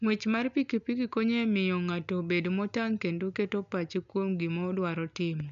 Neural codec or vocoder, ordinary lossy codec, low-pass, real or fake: none; MP3, 96 kbps; 7.2 kHz; real